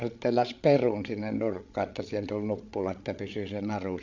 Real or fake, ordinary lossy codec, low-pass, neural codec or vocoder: fake; MP3, 64 kbps; 7.2 kHz; codec, 16 kHz, 8 kbps, FreqCodec, larger model